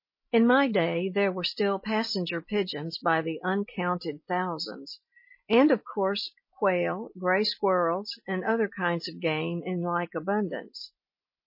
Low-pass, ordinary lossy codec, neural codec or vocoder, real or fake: 5.4 kHz; MP3, 24 kbps; none; real